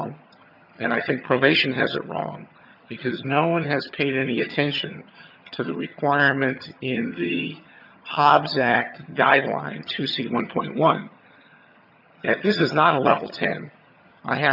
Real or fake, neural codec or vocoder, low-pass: fake; vocoder, 22.05 kHz, 80 mel bands, HiFi-GAN; 5.4 kHz